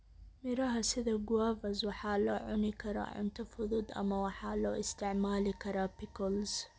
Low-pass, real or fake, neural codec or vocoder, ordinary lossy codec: none; real; none; none